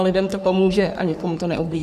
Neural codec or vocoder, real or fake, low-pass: codec, 44.1 kHz, 3.4 kbps, Pupu-Codec; fake; 14.4 kHz